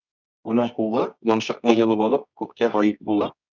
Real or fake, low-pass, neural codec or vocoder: fake; 7.2 kHz; codec, 24 kHz, 0.9 kbps, WavTokenizer, medium music audio release